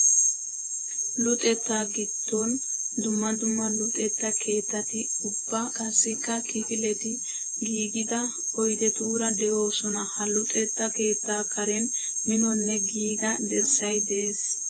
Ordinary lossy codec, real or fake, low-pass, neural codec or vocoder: AAC, 32 kbps; fake; 9.9 kHz; vocoder, 48 kHz, 128 mel bands, Vocos